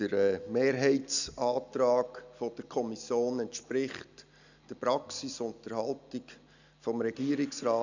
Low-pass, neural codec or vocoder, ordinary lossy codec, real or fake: 7.2 kHz; none; none; real